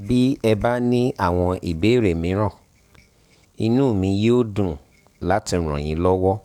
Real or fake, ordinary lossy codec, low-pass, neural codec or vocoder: fake; none; 19.8 kHz; codec, 44.1 kHz, 7.8 kbps, DAC